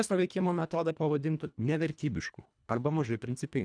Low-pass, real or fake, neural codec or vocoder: 9.9 kHz; fake; codec, 24 kHz, 1.5 kbps, HILCodec